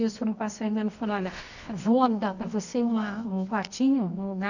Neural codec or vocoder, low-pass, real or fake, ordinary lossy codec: codec, 24 kHz, 0.9 kbps, WavTokenizer, medium music audio release; 7.2 kHz; fake; none